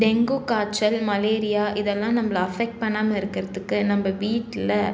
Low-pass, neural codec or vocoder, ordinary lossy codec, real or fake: none; none; none; real